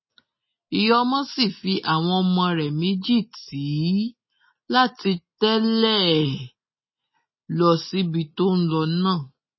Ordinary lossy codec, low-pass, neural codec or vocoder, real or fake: MP3, 24 kbps; 7.2 kHz; none; real